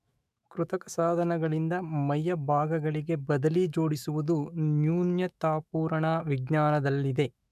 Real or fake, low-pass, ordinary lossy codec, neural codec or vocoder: fake; 14.4 kHz; none; autoencoder, 48 kHz, 128 numbers a frame, DAC-VAE, trained on Japanese speech